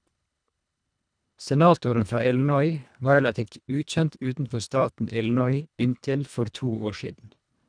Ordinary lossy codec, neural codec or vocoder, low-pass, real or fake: none; codec, 24 kHz, 1.5 kbps, HILCodec; 9.9 kHz; fake